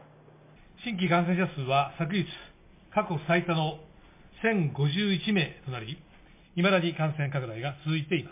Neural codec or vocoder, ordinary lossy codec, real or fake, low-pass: none; MP3, 24 kbps; real; 3.6 kHz